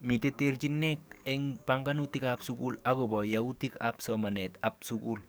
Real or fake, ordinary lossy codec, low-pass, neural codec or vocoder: fake; none; none; codec, 44.1 kHz, 7.8 kbps, DAC